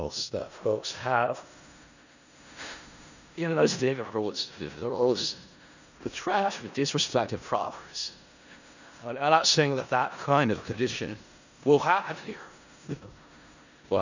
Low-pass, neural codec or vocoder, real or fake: 7.2 kHz; codec, 16 kHz in and 24 kHz out, 0.4 kbps, LongCat-Audio-Codec, four codebook decoder; fake